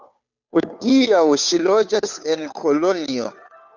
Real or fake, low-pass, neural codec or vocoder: fake; 7.2 kHz; codec, 16 kHz, 2 kbps, FunCodec, trained on Chinese and English, 25 frames a second